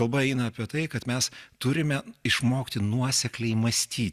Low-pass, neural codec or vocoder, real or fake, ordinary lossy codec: 14.4 kHz; none; real; Opus, 64 kbps